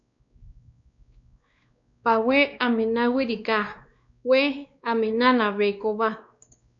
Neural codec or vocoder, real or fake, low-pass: codec, 16 kHz, 2 kbps, X-Codec, WavLM features, trained on Multilingual LibriSpeech; fake; 7.2 kHz